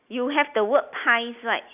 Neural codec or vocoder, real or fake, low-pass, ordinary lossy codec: none; real; 3.6 kHz; none